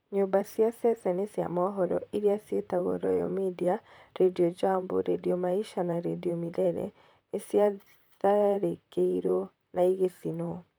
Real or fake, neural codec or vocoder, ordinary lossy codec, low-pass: fake; vocoder, 44.1 kHz, 128 mel bands, Pupu-Vocoder; none; none